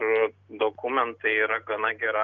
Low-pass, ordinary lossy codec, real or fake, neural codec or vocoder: 7.2 kHz; Opus, 64 kbps; real; none